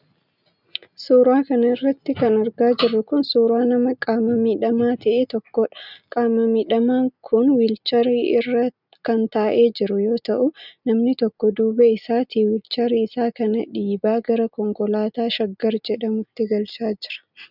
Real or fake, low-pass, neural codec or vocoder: real; 5.4 kHz; none